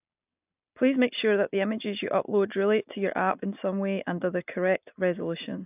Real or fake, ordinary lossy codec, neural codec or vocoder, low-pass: real; AAC, 32 kbps; none; 3.6 kHz